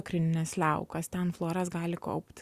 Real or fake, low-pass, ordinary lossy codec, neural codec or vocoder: real; 14.4 kHz; Opus, 64 kbps; none